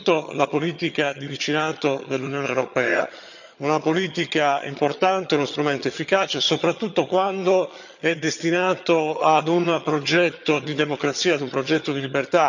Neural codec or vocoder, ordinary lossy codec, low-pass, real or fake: vocoder, 22.05 kHz, 80 mel bands, HiFi-GAN; none; 7.2 kHz; fake